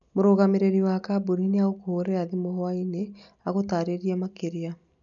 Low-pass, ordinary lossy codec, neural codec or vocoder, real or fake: 7.2 kHz; none; none; real